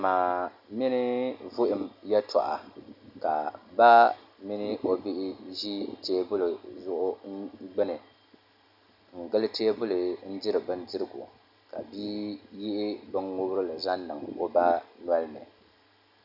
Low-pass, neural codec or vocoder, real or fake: 5.4 kHz; none; real